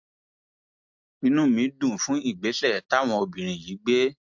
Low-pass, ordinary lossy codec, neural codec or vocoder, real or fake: 7.2 kHz; MP3, 48 kbps; none; real